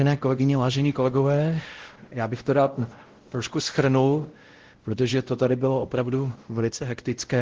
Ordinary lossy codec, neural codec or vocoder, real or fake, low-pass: Opus, 16 kbps; codec, 16 kHz, 0.5 kbps, X-Codec, WavLM features, trained on Multilingual LibriSpeech; fake; 7.2 kHz